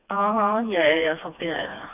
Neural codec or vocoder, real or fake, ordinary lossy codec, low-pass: codec, 16 kHz, 2 kbps, FreqCodec, smaller model; fake; none; 3.6 kHz